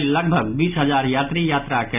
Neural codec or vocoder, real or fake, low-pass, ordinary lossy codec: none; real; 3.6 kHz; none